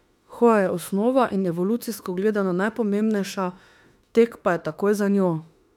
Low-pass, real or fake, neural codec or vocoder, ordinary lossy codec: 19.8 kHz; fake; autoencoder, 48 kHz, 32 numbers a frame, DAC-VAE, trained on Japanese speech; none